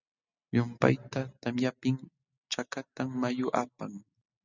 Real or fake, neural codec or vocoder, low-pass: real; none; 7.2 kHz